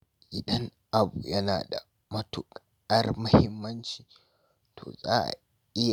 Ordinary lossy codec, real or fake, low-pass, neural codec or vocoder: none; real; none; none